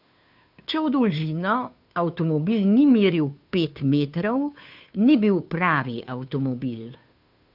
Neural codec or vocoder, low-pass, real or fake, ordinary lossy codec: codec, 16 kHz, 2 kbps, FunCodec, trained on Chinese and English, 25 frames a second; 5.4 kHz; fake; none